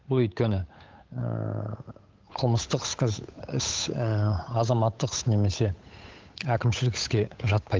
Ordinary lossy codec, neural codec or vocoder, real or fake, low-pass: Opus, 32 kbps; codec, 16 kHz, 8 kbps, FunCodec, trained on Chinese and English, 25 frames a second; fake; 7.2 kHz